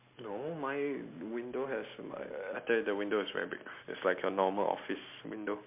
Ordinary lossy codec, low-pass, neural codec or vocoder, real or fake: MP3, 32 kbps; 3.6 kHz; none; real